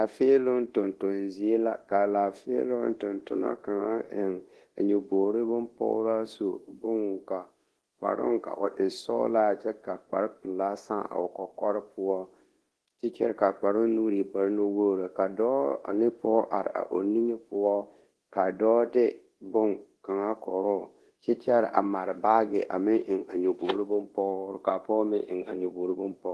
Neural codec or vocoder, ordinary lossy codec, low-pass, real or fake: codec, 24 kHz, 0.9 kbps, DualCodec; Opus, 16 kbps; 10.8 kHz; fake